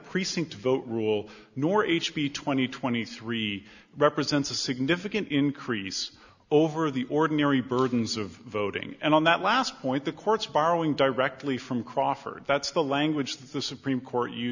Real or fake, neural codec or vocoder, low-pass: real; none; 7.2 kHz